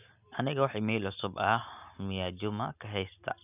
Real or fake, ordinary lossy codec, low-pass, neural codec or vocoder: real; none; 3.6 kHz; none